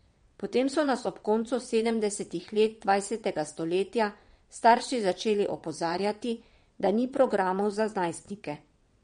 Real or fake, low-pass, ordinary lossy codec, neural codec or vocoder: fake; 9.9 kHz; MP3, 48 kbps; vocoder, 22.05 kHz, 80 mel bands, WaveNeXt